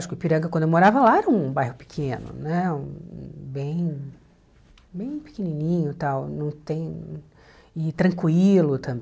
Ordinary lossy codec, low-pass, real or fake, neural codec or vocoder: none; none; real; none